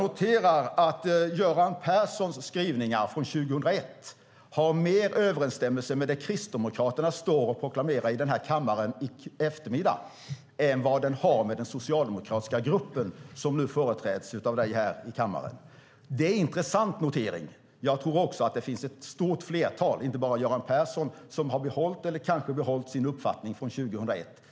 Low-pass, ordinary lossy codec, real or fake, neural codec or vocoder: none; none; real; none